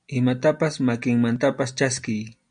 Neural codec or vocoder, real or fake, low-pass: none; real; 9.9 kHz